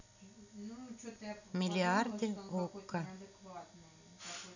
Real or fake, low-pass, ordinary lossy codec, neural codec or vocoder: real; 7.2 kHz; none; none